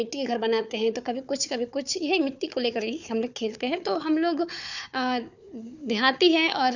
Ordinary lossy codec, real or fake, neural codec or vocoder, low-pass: none; fake; codec, 16 kHz, 4 kbps, FunCodec, trained on Chinese and English, 50 frames a second; 7.2 kHz